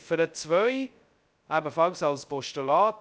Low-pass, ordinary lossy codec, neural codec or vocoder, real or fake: none; none; codec, 16 kHz, 0.2 kbps, FocalCodec; fake